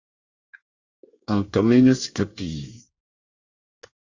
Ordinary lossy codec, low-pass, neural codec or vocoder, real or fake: Opus, 64 kbps; 7.2 kHz; codec, 24 kHz, 1 kbps, SNAC; fake